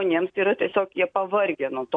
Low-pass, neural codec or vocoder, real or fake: 9.9 kHz; none; real